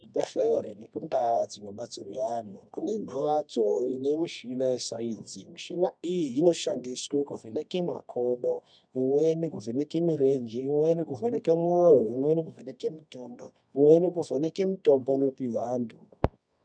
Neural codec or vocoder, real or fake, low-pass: codec, 24 kHz, 0.9 kbps, WavTokenizer, medium music audio release; fake; 9.9 kHz